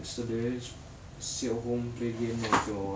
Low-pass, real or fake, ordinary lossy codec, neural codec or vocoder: none; real; none; none